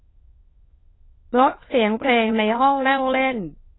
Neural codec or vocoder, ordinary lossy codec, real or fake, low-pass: autoencoder, 22.05 kHz, a latent of 192 numbers a frame, VITS, trained on many speakers; AAC, 16 kbps; fake; 7.2 kHz